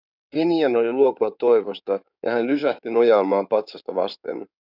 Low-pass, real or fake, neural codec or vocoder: 5.4 kHz; fake; codec, 16 kHz in and 24 kHz out, 2.2 kbps, FireRedTTS-2 codec